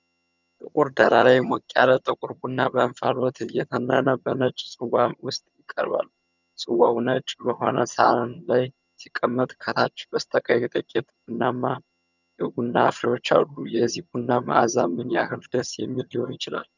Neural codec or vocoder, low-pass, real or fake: vocoder, 22.05 kHz, 80 mel bands, HiFi-GAN; 7.2 kHz; fake